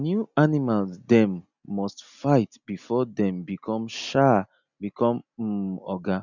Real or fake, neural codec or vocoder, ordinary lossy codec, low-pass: real; none; none; 7.2 kHz